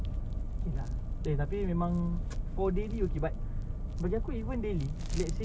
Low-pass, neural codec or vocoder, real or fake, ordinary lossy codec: none; none; real; none